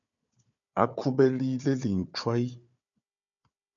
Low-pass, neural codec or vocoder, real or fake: 7.2 kHz; codec, 16 kHz, 4 kbps, FunCodec, trained on Chinese and English, 50 frames a second; fake